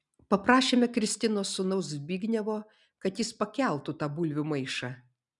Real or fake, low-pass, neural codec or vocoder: real; 10.8 kHz; none